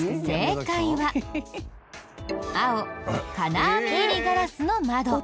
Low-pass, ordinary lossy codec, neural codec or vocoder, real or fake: none; none; none; real